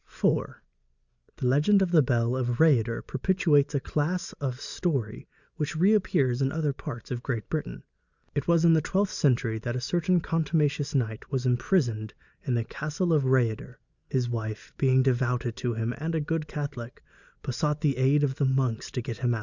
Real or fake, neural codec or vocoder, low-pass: real; none; 7.2 kHz